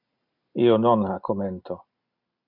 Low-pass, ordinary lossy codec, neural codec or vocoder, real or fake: 5.4 kHz; MP3, 48 kbps; none; real